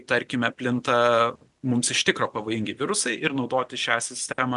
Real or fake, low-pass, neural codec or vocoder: real; 10.8 kHz; none